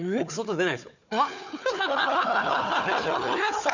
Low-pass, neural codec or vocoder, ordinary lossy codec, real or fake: 7.2 kHz; codec, 16 kHz, 4 kbps, FunCodec, trained on Chinese and English, 50 frames a second; none; fake